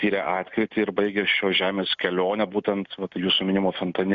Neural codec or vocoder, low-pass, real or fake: none; 7.2 kHz; real